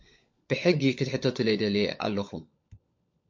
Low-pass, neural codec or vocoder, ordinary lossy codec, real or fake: 7.2 kHz; codec, 16 kHz, 16 kbps, FunCodec, trained on LibriTTS, 50 frames a second; MP3, 48 kbps; fake